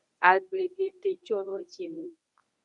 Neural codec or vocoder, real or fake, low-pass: codec, 24 kHz, 0.9 kbps, WavTokenizer, medium speech release version 1; fake; 10.8 kHz